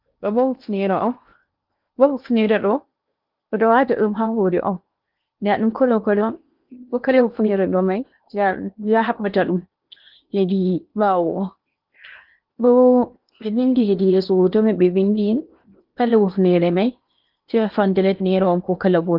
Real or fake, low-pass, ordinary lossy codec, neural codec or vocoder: fake; 5.4 kHz; Opus, 24 kbps; codec, 16 kHz in and 24 kHz out, 0.8 kbps, FocalCodec, streaming, 65536 codes